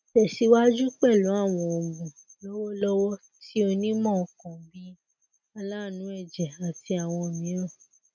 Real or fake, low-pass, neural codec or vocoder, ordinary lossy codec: real; 7.2 kHz; none; none